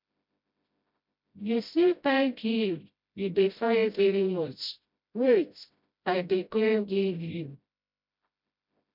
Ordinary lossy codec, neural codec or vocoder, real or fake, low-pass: MP3, 48 kbps; codec, 16 kHz, 0.5 kbps, FreqCodec, smaller model; fake; 5.4 kHz